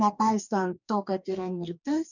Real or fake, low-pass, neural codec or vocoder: fake; 7.2 kHz; codec, 44.1 kHz, 2.6 kbps, DAC